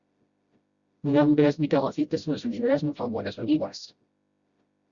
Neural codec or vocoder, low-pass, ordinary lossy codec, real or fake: codec, 16 kHz, 0.5 kbps, FreqCodec, smaller model; 7.2 kHz; Opus, 64 kbps; fake